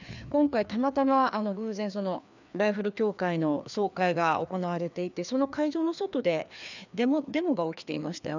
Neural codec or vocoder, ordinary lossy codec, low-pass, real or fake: codec, 16 kHz, 2 kbps, FreqCodec, larger model; none; 7.2 kHz; fake